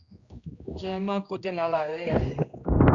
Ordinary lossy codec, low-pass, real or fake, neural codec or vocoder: Opus, 64 kbps; 7.2 kHz; fake; codec, 16 kHz, 1 kbps, X-Codec, HuBERT features, trained on general audio